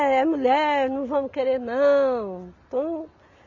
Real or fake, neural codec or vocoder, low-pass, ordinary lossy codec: real; none; 7.2 kHz; none